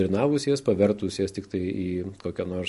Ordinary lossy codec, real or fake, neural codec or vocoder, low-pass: MP3, 48 kbps; real; none; 14.4 kHz